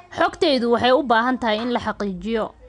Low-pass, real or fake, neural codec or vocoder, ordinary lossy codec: 9.9 kHz; real; none; none